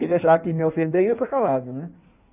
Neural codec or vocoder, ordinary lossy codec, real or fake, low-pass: codec, 16 kHz in and 24 kHz out, 1.1 kbps, FireRedTTS-2 codec; none; fake; 3.6 kHz